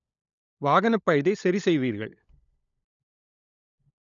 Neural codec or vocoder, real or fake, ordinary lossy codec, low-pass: codec, 16 kHz, 16 kbps, FunCodec, trained on LibriTTS, 50 frames a second; fake; none; 7.2 kHz